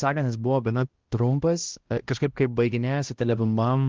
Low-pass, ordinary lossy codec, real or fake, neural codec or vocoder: 7.2 kHz; Opus, 16 kbps; fake; codec, 16 kHz, 1 kbps, X-Codec, HuBERT features, trained on balanced general audio